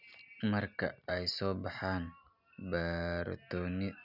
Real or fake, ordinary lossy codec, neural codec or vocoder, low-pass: real; none; none; 5.4 kHz